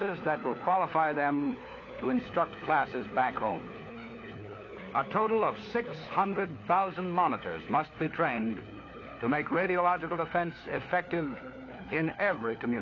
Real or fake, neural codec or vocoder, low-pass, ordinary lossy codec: fake; codec, 16 kHz, 4 kbps, FunCodec, trained on LibriTTS, 50 frames a second; 7.2 kHz; AAC, 32 kbps